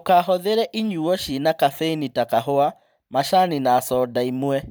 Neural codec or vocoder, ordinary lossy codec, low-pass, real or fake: vocoder, 44.1 kHz, 128 mel bands every 512 samples, BigVGAN v2; none; none; fake